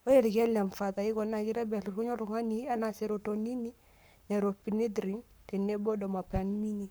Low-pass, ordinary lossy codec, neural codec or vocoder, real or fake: none; none; codec, 44.1 kHz, 7.8 kbps, Pupu-Codec; fake